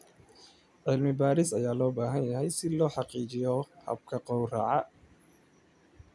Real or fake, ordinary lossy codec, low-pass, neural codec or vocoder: real; none; none; none